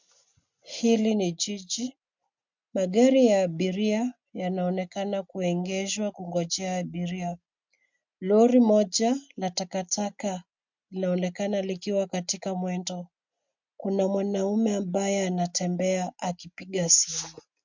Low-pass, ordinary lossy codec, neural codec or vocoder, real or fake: 7.2 kHz; MP3, 64 kbps; none; real